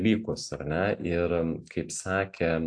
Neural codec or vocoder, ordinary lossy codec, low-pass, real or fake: none; AAC, 64 kbps; 9.9 kHz; real